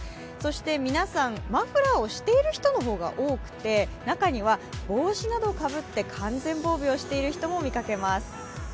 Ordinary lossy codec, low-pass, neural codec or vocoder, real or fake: none; none; none; real